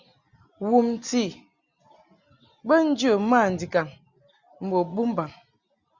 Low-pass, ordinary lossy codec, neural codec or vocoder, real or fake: 7.2 kHz; Opus, 64 kbps; none; real